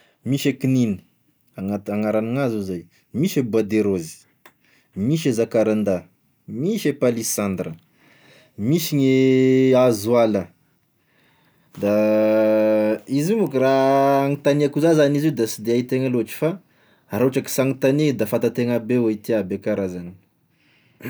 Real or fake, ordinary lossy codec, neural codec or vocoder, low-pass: real; none; none; none